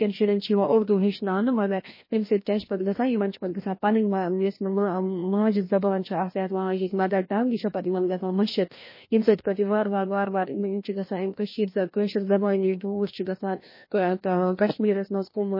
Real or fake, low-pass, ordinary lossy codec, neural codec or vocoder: fake; 5.4 kHz; MP3, 24 kbps; codec, 16 kHz, 1 kbps, FreqCodec, larger model